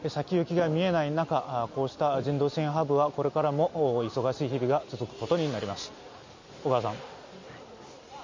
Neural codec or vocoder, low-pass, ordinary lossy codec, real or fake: none; 7.2 kHz; MP3, 64 kbps; real